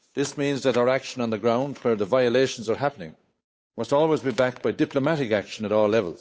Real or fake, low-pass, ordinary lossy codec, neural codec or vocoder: fake; none; none; codec, 16 kHz, 8 kbps, FunCodec, trained on Chinese and English, 25 frames a second